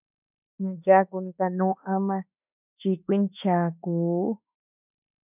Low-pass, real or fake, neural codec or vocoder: 3.6 kHz; fake; autoencoder, 48 kHz, 32 numbers a frame, DAC-VAE, trained on Japanese speech